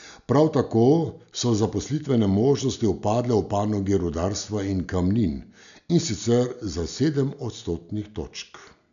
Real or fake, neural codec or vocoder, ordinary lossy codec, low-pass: real; none; none; 7.2 kHz